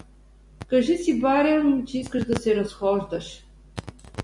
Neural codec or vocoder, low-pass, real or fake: none; 10.8 kHz; real